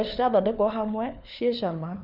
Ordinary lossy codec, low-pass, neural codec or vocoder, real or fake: none; 5.4 kHz; codec, 16 kHz, 4 kbps, FunCodec, trained on LibriTTS, 50 frames a second; fake